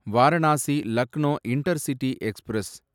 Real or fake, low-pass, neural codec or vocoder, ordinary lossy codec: real; 19.8 kHz; none; none